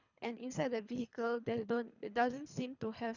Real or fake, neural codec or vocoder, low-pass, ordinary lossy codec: fake; codec, 24 kHz, 3 kbps, HILCodec; 7.2 kHz; none